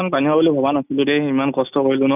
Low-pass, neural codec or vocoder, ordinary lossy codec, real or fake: 3.6 kHz; none; none; real